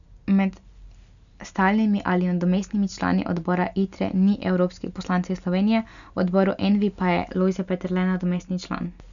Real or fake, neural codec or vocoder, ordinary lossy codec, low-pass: real; none; none; 7.2 kHz